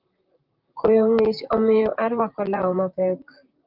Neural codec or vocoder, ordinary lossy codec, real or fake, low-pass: vocoder, 44.1 kHz, 128 mel bands, Pupu-Vocoder; Opus, 24 kbps; fake; 5.4 kHz